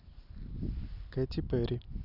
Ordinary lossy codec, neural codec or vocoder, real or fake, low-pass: none; none; real; 5.4 kHz